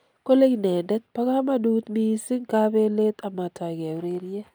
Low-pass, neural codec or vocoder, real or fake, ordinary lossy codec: none; none; real; none